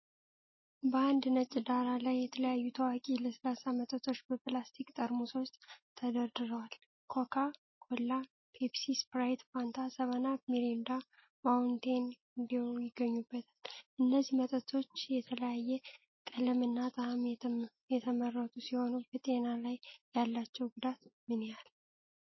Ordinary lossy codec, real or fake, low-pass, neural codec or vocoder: MP3, 24 kbps; real; 7.2 kHz; none